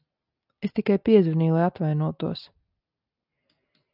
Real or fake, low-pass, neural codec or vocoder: real; 5.4 kHz; none